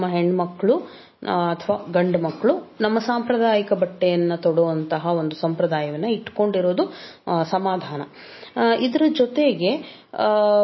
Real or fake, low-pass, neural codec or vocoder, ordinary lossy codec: real; 7.2 kHz; none; MP3, 24 kbps